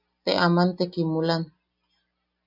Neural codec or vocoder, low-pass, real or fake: none; 5.4 kHz; real